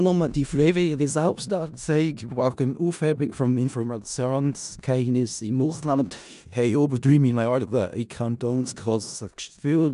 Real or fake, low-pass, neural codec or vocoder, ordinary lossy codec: fake; 10.8 kHz; codec, 16 kHz in and 24 kHz out, 0.4 kbps, LongCat-Audio-Codec, four codebook decoder; none